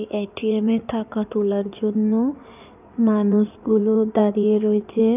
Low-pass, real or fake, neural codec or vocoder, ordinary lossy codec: 3.6 kHz; fake; codec, 16 kHz in and 24 kHz out, 2.2 kbps, FireRedTTS-2 codec; none